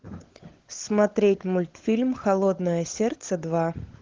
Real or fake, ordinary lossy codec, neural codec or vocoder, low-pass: fake; Opus, 16 kbps; codec, 16 kHz, 16 kbps, FunCodec, trained on LibriTTS, 50 frames a second; 7.2 kHz